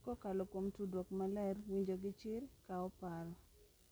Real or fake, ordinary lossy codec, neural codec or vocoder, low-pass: real; none; none; none